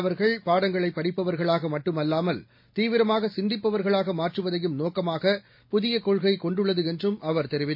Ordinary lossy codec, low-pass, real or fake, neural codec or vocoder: MP3, 32 kbps; 5.4 kHz; real; none